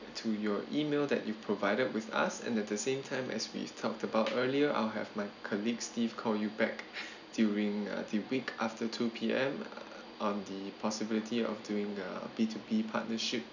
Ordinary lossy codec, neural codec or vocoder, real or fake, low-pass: none; none; real; 7.2 kHz